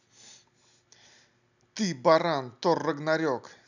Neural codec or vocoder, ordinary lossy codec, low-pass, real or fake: none; none; 7.2 kHz; real